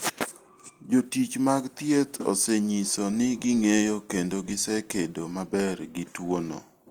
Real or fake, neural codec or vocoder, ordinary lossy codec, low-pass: real; none; Opus, 24 kbps; 19.8 kHz